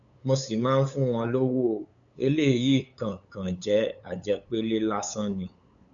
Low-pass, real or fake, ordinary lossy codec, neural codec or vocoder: 7.2 kHz; fake; none; codec, 16 kHz, 8 kbps, FunCodec, trained on LibriTTS, 25 frames a second